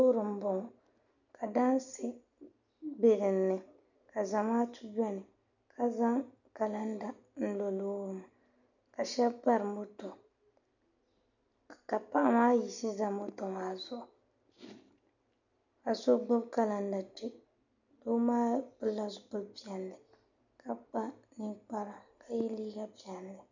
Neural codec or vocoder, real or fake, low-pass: none; real; 7.2 kHz